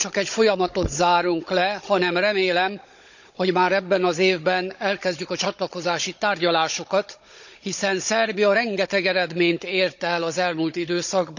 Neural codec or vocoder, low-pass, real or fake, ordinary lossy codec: codec, 16 kHz, 16 kbps, FunCodec, trained on Chinese and English, 50 frames a second; 7.2 kHz; fake; none